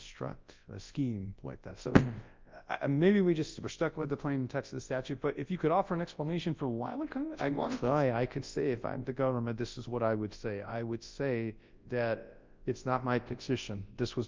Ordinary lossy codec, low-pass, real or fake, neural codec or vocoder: Opus, 24 kbps; 7.2 kHz; fake; codec, 24 kHz, 0.9 kbps, WavTokenizer, large speech release